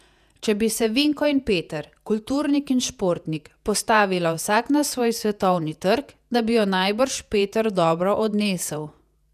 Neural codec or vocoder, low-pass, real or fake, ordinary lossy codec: vocoder, 48 kHz, 128 mel bands, Vocos; 14.4 kHz; fake; none